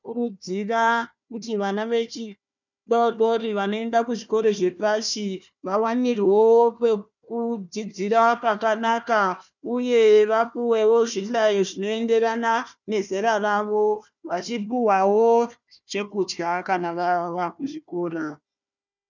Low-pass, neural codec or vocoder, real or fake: 7.2 kHz; codec, 16 kHz, 1 kbps, FunCodec, trained on Chinese and English, 50 frames a second; fake